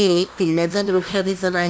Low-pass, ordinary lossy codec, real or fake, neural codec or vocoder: none; none; fake; codec, 16 kHz, 0.5 kbps, FunCodec, trained on LibriTTS, 25 frames a second